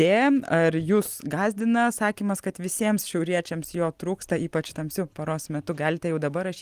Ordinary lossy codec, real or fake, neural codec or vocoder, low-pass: Opus, 24 kbps; real; none; 14.4 kHz